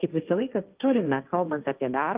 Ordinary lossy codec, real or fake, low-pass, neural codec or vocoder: Opus, 32 kbps; fake; 3.6 kHz; codec, 16 kHz, 1.1 kbps, Voila-Tokenizer